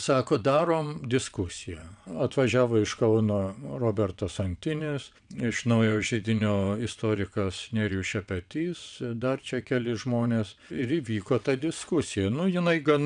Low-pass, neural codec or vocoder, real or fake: 9.9 kHz; vocoder, 22.05 kHz, 80 mel bands, WaveNeXt; fake